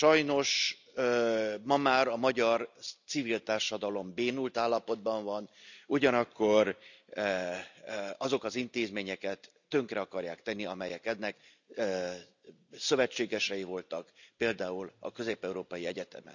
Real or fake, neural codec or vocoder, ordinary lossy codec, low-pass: real; none; none; 7.2 kHz